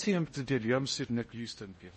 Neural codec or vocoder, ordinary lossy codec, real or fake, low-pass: codec, 16 kHz in and 24 kHz out, 0.6 kbps, FocalCodec, streaming, 2048 codes; MP3, 32 kbps; fake; 10.8 kHz